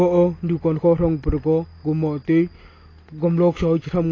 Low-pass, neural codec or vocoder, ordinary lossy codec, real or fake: 7.2 kHz; none; AAC, 32 kbps; real